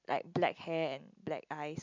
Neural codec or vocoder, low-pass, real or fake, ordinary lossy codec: none; 7.2 kHz; real; MP3, 64 kbps